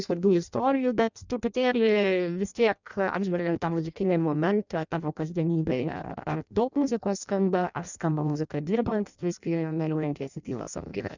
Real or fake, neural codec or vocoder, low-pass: fake; codec, 16 kHz in and 24 kHz out, 0.6 kbps, FireRedTTS-2 codec; 7.2 kHz